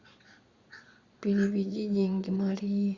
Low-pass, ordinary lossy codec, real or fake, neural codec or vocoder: 7.2 kHz; Opus, 64 kbps; fake; vocoder, 22.05 kHz, 80 mel bands, Vocos